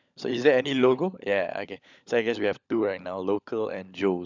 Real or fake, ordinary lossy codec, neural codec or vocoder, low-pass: fake; none; codec, 16 kHz, 16 kbps, FunCodec, trained on LibriTTS, 50 frames a second; 7.2 kHz